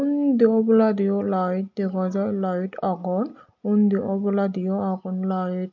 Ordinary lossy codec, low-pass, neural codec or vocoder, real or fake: MP3, 48 kbps; 7.2 kHz; none; real